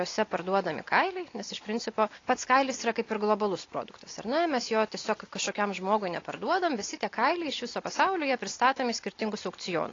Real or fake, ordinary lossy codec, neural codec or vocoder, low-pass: real; AAC, 32 kbps; none; 7.2 kHz